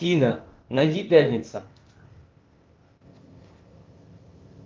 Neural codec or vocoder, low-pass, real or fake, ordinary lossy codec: codec, 16 kHz, 0.8 kbps, ZipCodec; 7.2 kHz; fake; Opus, 32 kbps